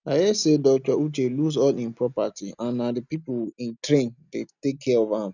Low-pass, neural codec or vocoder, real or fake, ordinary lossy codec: 7.2 kHz; none; real; none